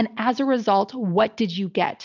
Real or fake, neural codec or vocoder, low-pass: real; none; 7.2 kHz